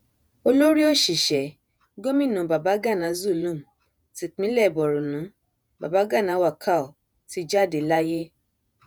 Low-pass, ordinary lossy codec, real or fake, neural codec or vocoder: none; none; fake; vocoder, 48 kHz, 128 mel bands, Vocos